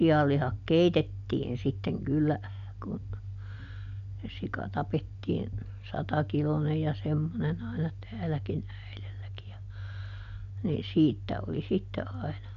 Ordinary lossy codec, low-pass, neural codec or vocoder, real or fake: none; 7.2 kHz; none; real